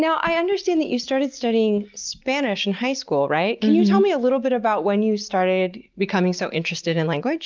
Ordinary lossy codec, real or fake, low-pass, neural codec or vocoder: Opus, 32 kbps; real; 7.2 kHz; none